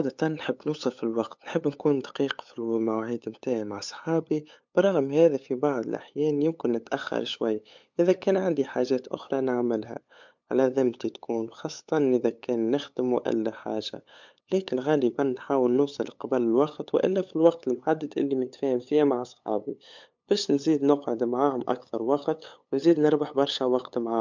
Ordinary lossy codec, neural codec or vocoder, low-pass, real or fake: MP3, 64 kbps; codec, 16 kHz, 8 kbps, FunCodec, trained on LibriTTS, 25 frames a second; 7.2 kHz; fake